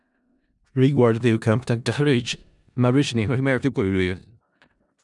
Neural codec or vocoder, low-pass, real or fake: codec, 16 kHz in and 24 kHz out, 0.4 kbps, LongCat-Audio-Codec, four codebook decoder; 10.8 kHz; fake